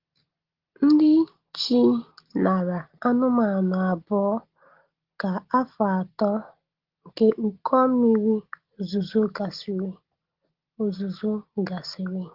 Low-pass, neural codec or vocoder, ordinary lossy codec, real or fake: 5.4 kHz; none; Opus, 16 kbps; real